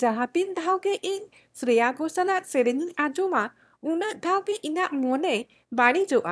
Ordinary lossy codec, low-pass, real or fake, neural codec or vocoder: none; none; fake; autoencoder, 22.05 kHz, a latent of 192 numbers a frame, VITS, trained on one speaker